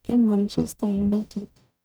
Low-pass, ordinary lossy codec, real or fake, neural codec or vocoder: none; none; fake; codec, 44.1 kHz, 0.9 kbps, DAC